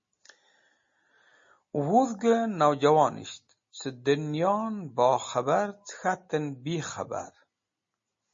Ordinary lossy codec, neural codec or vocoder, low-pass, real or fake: MP3, 32 kbps; none; 7.2 kHz; real